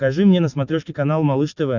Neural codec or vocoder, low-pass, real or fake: none; 7.2 kHz; real